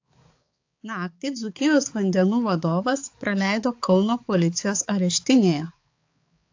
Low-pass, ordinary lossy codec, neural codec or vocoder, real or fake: 7.2 kHz; AAC, 48 kbps; codec, 16 kHz, 4 kbps, X-Codec, HuBERT features, trained on balanced general audio; fake